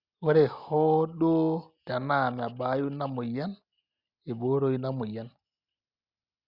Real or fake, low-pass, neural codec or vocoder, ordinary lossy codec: real; 5.4 kHz; none; Opus, 64 kbps